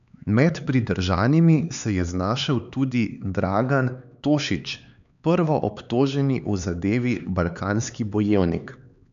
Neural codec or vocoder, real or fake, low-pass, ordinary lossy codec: codec, 16 kHz, 4 kbps, X-Codec, HuBERT features, trained on LibriSpeech; fake; 7.2 kHz; none